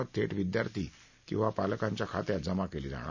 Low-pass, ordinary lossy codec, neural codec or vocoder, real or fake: 7.2 kHz; none; none; real